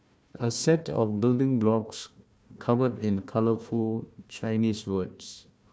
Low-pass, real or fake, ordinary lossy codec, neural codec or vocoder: none; fake; none; codec, 16 kHz, 1 kbps, FunCodec, trained on Chinese and English, 50 frames a second